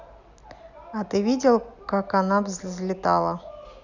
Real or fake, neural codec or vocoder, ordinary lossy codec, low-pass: real; none; none; 7.2 kHz